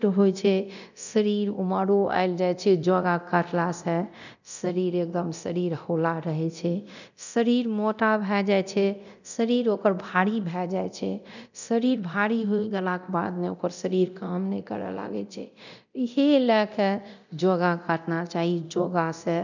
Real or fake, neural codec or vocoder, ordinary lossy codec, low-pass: fake; codec, 24 kHz, 0.9 kbps, DualCodec; none; 7.2 kHz